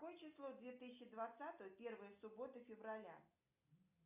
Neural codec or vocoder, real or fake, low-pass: none; real; 3.6 kHz